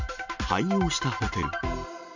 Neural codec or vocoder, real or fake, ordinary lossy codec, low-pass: none; real; none; 7.2 kHz